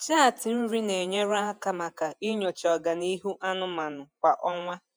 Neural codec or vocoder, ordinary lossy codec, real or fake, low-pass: vocoder, 48 kHz, 128 mel bands, Vocos; none; fake; none